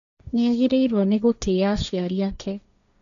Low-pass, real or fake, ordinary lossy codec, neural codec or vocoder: 7.2 kHz; fake; none; codec, 16 kHz, 1.1 kbps, Voila-Tokenizer